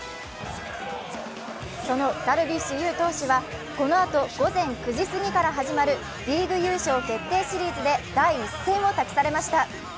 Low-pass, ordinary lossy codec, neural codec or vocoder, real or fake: none; none; none; real